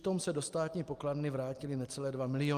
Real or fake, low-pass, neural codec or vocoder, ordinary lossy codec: real; 14.4 kHz; none; Opus, 32 kbps